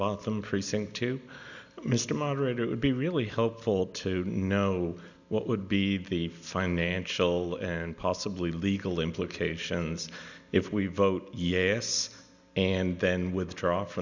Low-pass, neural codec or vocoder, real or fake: 7.2 kHz; none; real